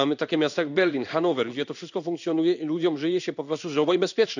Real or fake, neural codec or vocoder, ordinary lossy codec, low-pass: fake; codec, 16 kHz in and 24 kHz out, 1 kbps, XY-Tokenizer; none; 7.2 kHz